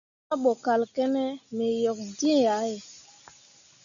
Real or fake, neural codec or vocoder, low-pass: real; none; 7.2 kHz